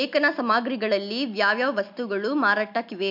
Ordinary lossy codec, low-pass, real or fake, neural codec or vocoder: MP3, 48 kbps; 5.4 kHz; real; none